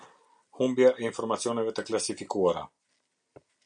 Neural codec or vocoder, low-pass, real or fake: none; 9.9 kHz; real